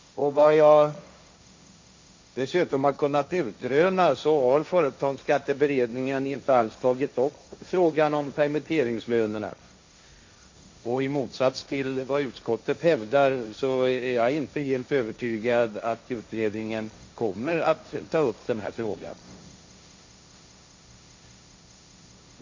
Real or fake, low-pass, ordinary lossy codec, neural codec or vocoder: fake; 7.2 kHz; MP3, 48 kbps; codec, 16 kHz, 1.1 kbps, Voila-Tokenizer